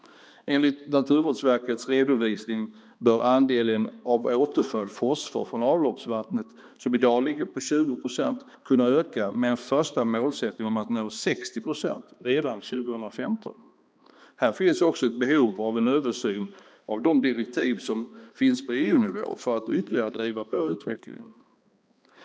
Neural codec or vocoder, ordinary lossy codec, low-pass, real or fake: codec, 16 kHz, 2 kbps, X-Codec, HuBERT features, trained on balanced general audio; none; none; fake